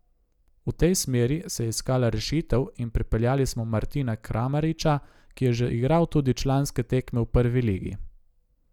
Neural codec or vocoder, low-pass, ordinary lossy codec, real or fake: none; 19.8 kHz; none; real